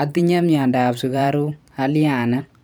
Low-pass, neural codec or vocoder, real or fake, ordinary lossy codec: none; vocoder, 44.1 kHz, 128 mel bands every 512 samples, BigVGAN v2; fake; none